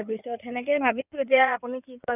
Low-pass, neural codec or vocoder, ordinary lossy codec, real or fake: 3.6 kHz; codec, 16 kHz in and 24 kHz out, 2.2 kbps, FireRedTTS-2 codec; none; fake